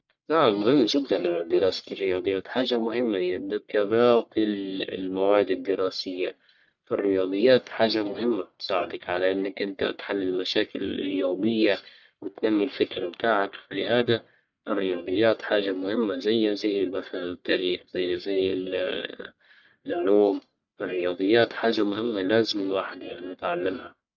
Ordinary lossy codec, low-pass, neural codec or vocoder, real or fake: none; 7.2 kHz; codec, 44.1 kHz, 1.7 kbps, Pupu-Codec; fake